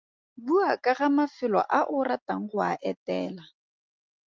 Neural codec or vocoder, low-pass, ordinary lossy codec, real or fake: none; 7.2 kHz; Opus, 24 kbps; real